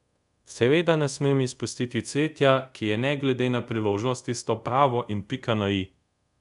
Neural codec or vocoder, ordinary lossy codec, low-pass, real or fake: codec, 24 kHz, 0.5 kbps, DualCodec; none; 10.8 kHz; fake